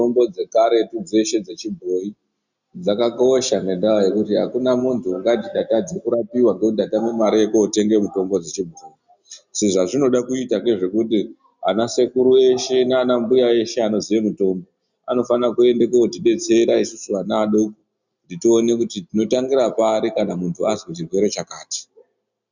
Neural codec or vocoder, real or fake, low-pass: none; real; 7.2 kHz